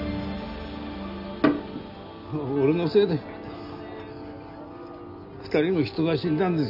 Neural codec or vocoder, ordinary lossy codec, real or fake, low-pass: none; none; real; 5.4 kHz